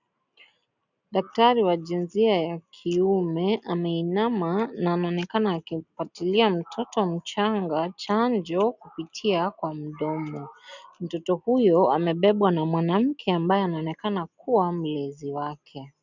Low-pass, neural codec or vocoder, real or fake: 7.2 kHz; none; real